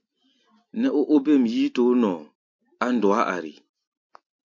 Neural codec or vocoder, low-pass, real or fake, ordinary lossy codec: none; 7.2 kHz; real; MP3, 48 kbps